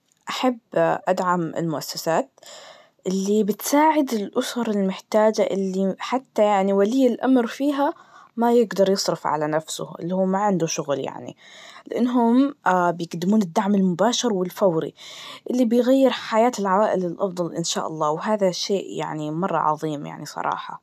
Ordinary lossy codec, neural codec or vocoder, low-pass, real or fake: none; none; 14.4 kHz; real